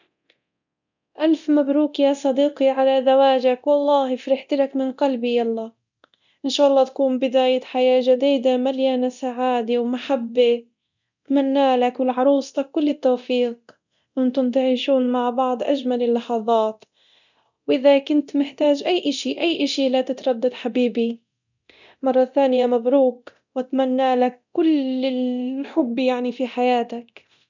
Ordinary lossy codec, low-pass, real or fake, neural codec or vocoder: none; 7.2 kHz; fake; codec, 24 kHz, 0.9 kbps, DualCodec